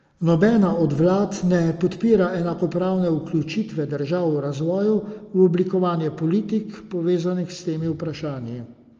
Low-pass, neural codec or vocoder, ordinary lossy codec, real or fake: 7.2 kHz; none; Opus, 24 kbps; real